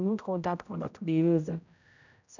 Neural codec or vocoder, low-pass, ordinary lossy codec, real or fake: codec, 16 kHz, 0.5 kbps, X-Codec, HuBERT features, trained on general audio; 7.2 kHz; none; fake